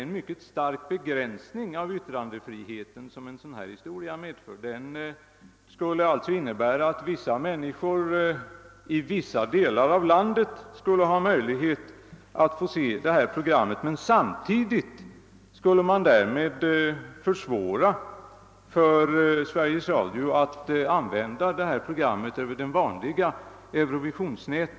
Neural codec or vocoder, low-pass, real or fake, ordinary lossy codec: none; none; real; none